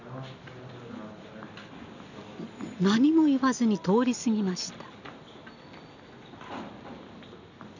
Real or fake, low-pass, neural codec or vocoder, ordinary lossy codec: real; 7.2 kHz; none; none